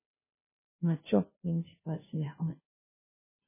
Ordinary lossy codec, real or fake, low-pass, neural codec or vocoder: MP3, 16 kbps; fake; 3.6 kHz; codec, 16 kHz, 0.5 kbps, FunCodec, trained on Chinese and English, 25 frames a second